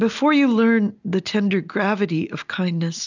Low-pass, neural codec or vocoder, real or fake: 7.2 kHz; none; real